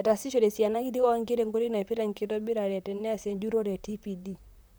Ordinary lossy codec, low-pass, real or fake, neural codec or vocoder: none; none; fake; vocoder, 44.1 kHz, 128 mel bands, Pupu-Vocoder